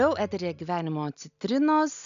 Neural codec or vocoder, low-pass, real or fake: none; 7.2 kHz; real